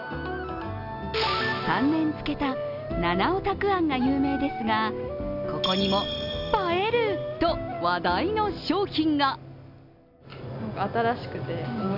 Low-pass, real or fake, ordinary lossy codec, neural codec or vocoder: 5.4 kHz; real; none; none